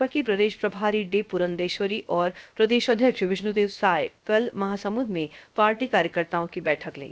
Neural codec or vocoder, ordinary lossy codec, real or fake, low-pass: codec, 16 kHz, 0.7 kbps, FocalCodec; none; fake; none